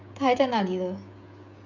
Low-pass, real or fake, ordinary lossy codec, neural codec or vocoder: 7.2 kHz; fake; none; codec, 16 kHz, 16 kbps, FreqCodec, smaller model